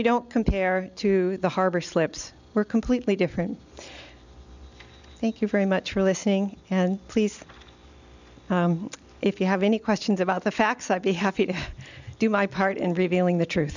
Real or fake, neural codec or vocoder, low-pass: real; none; 7.2 kHz